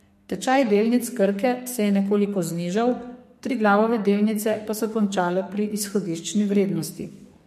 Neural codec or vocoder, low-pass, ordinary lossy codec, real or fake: codec, 44.1 kHz, 2.6 kbps, SNAC; 14.4 kHz; MP3, 64 kbps; fake